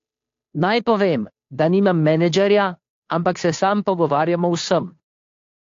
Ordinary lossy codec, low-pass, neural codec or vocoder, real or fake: AAC, 48 kbps; 7.2 kHz; codec, 16 kHz, 2 kbps, FunCodec, trained on Chinese and English, 25 frames a second; fake